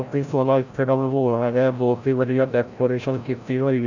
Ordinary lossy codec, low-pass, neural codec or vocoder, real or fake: none; 7.2 kHz; codec, 16 kHz, 0.5 kbps, FreqCodec, larger model; fake